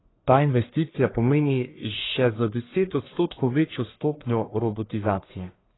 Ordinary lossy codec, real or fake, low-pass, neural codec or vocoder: AAC, 16 kbps; fake; 7.2 kHz; codec, 44.1 kHz, 1.7 kbps, Pupu-Codec